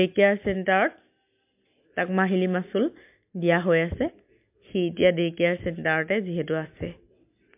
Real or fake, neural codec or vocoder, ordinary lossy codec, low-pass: real; none; MP3, 32 kbps; 3.6 kHz